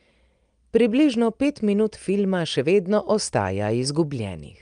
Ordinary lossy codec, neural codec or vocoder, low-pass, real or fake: Opus, 32 kbps; none; 9.9 kHz; real